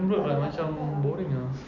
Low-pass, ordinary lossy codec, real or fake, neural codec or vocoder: 7.2 kHz; none; real; none